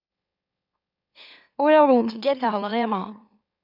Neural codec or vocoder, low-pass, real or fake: autoencoder, 44.1 kHz, a latent of 192 numbers a frame, MeloTTS; 5.4 kHz; fake